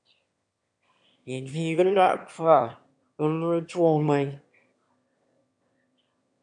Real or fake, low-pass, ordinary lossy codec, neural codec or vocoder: fake; 9.9 kHz; MP3, 64 kbps; autoencoder, 22.05 kHz, a latent of 192 numbers a frame, VITS, trained on one speaker